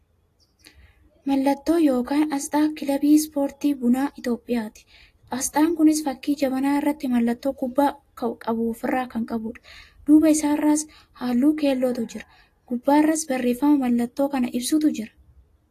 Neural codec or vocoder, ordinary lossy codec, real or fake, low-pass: none; AAC, 48 kbps; real; 14.4 kHz